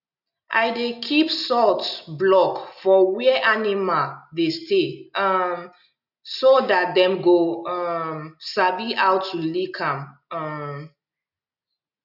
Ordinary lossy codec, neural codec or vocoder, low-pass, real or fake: none; none; 5.4 kHz; real